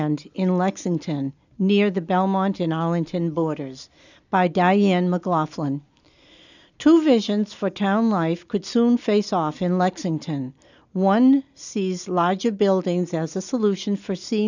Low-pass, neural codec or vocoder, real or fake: 7.2 kHz; none; real